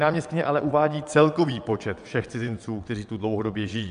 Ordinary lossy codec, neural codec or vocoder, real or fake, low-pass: AAC, 96 kbps; vocoder, 22.05 kHz, 80 mel bands, WaveNeXt; fake; 9.9 kHz